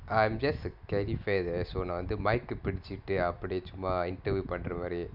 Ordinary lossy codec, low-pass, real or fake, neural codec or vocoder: none; 5.4 kHz; real; none